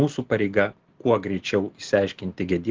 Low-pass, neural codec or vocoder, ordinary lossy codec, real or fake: 7.2 kHz; none; Opus, 16 kbps; real